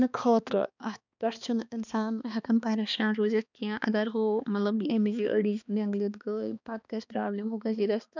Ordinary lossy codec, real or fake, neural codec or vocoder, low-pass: none; fake; codec, 16 kHz, 2 kbps, X-Codec, HuBERT features, trained on balanced general audio; 7.2 kHz